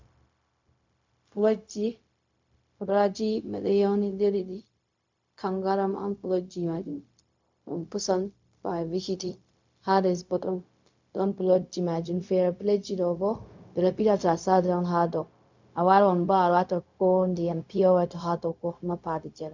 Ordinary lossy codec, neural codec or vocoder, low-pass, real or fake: MP3, 64 kbps; codec, 16 kHz, 0.4 kbps, LongCat-Audio-Codec; 7.2 kHz; fake